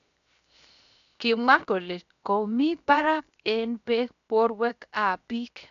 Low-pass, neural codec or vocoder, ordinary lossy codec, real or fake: 7.2 kHz; codec, 16 kHz, 0.7 kbps, FocalCodec; none; fake